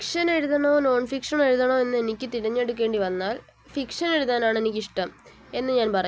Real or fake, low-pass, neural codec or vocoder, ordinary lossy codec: real; none; none; none